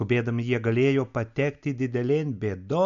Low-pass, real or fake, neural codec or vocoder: 7.2 kHz; real; none